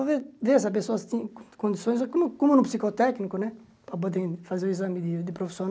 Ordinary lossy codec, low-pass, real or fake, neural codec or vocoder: none; none; real; none